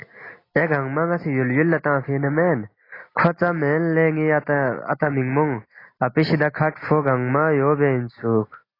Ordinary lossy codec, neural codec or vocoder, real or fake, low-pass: AAC, 24 kbps; none; real; 5.4 kHz